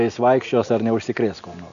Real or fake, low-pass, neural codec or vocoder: real; 7.2 kHz; none